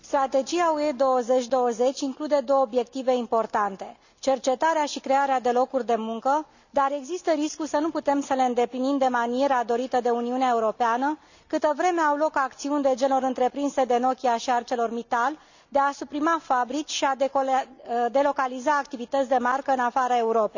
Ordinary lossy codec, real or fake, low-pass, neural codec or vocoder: none; real; 7.2 kHz; none